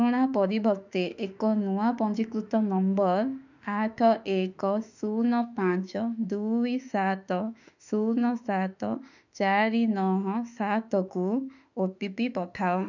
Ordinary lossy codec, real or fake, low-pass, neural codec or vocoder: none; fake; 7.2 kHz; autoencoder, 48 kHz, 32 numbers a frame, DAC-VAE, trained on Japanese speech